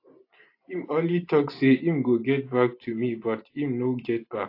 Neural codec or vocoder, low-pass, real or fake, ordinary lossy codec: none; 5.4 kHz; real; AAC, 32 kbps